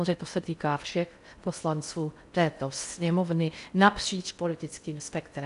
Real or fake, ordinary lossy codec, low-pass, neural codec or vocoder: fake; MP3, 96 kbps; 10.8 kHz; codec, 16 kHz in and 24 kHz out, 0.6 kbps, FocalCodec, streaming, 4096 codes